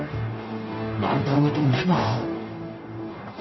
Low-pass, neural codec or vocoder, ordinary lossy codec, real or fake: 7.2 kHz; codec, 44.1 kHz, 0.9 kbps, DAC; MP3, 24 kbps; fake